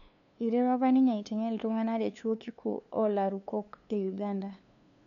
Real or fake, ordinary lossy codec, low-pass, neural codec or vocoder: fake; none; 7.2 kHz; codec, 16 kHz, 2 kbps, FunCodec, trained on LibriTTS, 25 frames a second